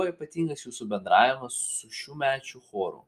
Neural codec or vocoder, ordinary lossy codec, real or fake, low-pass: none; Opus, 32 kbps; real; 14.4 kHz